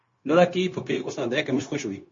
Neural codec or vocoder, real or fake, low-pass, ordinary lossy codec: codec, 16 kHz, 0.9 kbps, LongCat-Audio-Codec; fake; 7.2 kHz; MP3, 32 kbps